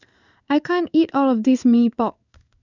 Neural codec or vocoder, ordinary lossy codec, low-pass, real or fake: codec, 16 kHz in and 24 kHz out, 1 kbps, XY-Tokenizer; none; 7.2 kHz; fake